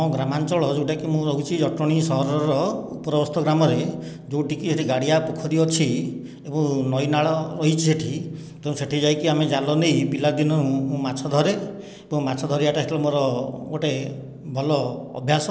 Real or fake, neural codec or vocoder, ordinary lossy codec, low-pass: real; none; none; none